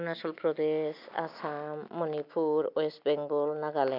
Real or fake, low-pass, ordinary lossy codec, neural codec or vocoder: real; 5.4 kHz; AAC, 48 kbps; none